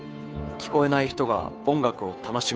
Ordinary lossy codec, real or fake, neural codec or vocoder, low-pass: none; fake; codec, 16 kHz, 2 kbps, FunCodec, trained on Chinese and English, 25 frames a second; none